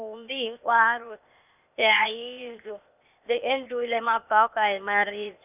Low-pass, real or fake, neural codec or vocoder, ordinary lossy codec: 3.6 kHz; fake; codec, 16 kHz, 0.8 kbps, ZipCodec; none